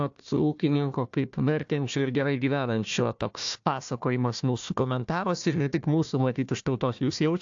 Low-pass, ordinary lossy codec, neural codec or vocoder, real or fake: 7.2 kHz; AAC, 64 kbps; codec, 16 kHz, 1 kbps, FunCodec, trained on Chinese and English, 50 frames a second; fake